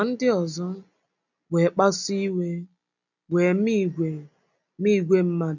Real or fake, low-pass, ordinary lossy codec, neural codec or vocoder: real; 7.2 kHz; none; none